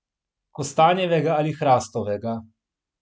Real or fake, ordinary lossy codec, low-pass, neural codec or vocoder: real; none; none; none